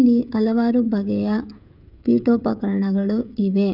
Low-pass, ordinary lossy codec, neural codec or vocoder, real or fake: 5.4 kHz; none; codec, 16 kHz, 16 kbps, FreqCodec, smaller model; fake